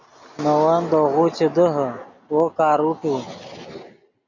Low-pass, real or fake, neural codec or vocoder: 7.2 kHz; real; none